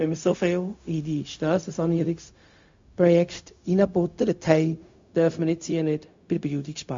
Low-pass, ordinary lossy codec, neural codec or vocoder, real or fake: 7.2 kHz; MP3, 48 kbps; codec, 16 kHz, 0.4 kbps, LongCat-Audio-Codec; fake